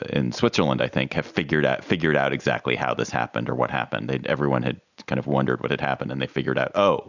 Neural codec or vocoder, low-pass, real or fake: none; 7.2 kHz; real